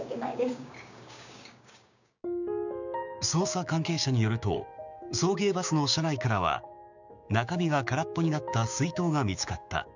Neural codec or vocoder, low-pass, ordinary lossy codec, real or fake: codec, 16 kHz, 6 kbps, DAC; 7.2 kHz; none; fake